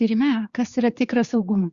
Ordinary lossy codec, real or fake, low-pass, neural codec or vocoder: Opus, 64 kbps; fake; 7.2 kHz; codec, 16 kHz, 4 kbps, X-Codec, HuBERT features, trained on general audio